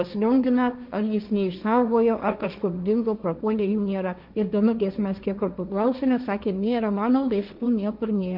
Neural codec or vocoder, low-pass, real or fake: codec, 16 kHz, 1.1 kbps, Voila-Tokenizer; 5.4 kHz; fake